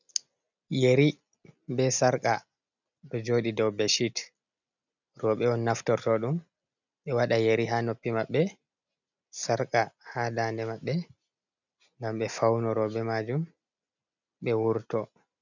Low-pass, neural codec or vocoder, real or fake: 7.2 kHz; none; real